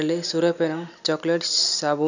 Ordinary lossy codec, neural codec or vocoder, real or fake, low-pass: none; none; real; 7.2 kHz